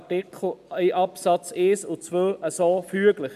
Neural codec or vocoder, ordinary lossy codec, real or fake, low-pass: none; MP3, 96 kbps; real; 14.4 kHz